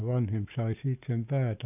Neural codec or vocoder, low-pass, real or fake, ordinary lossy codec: none; 3.6 kHz; real; none